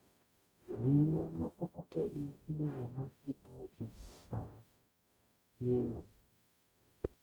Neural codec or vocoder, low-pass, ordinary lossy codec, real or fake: codec, 44.1 kHz, 0.9 kbps, DAC; none; none; fake